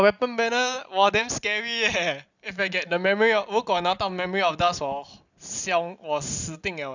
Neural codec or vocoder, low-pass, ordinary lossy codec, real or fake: none; 7.2 kHz; none; real